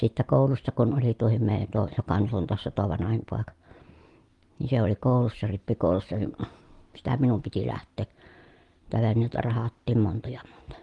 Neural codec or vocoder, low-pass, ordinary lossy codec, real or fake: none; 10.8 kHz; Opus, 24 kbps; real